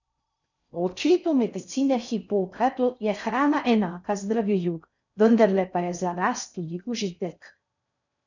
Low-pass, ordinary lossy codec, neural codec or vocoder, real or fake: 7.2 kHz; none; codec, 16 kHz in and 24 kHz out, 0.6 kbps, FocalCodec, streaming, 4096 codes; fake